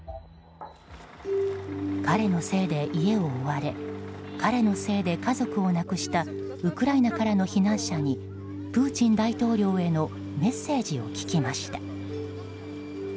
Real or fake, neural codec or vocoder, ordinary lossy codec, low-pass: real; none; none; none